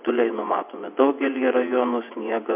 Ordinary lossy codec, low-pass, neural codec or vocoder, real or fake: MP3, 32 kbps; 3.6 kHz; vocoder, 22.05 kHz, 80 mel bands, WaveNeXt; fake